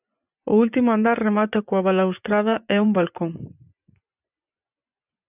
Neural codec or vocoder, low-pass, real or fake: vocoder, 24 kHz, 100 mel bands, Vocos; 3.6 kHz; fake